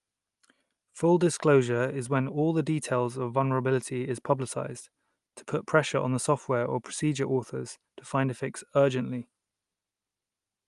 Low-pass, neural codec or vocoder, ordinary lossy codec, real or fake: 10.8 kHz; none; Opus, 32 kbps; real